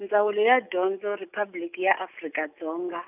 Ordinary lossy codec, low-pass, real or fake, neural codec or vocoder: none; 3.6 kHz; real; none